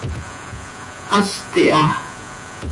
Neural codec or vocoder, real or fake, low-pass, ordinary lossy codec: vocoder, 48 kHz, 128 mel bands, Vocos; fake; 10.8 kHz; AAC, 64 kbps